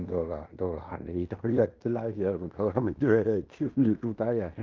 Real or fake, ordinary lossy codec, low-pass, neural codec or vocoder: fake; Opus, 32 kbps; 7.2 kHz; codec, 16 kHz in and 24 kHz out, 0.9 kbps, LongCat-Audio-Codec, fine tuned four codebook decoder